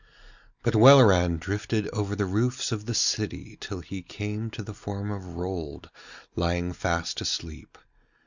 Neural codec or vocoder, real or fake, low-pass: none; real; 7.2 kHz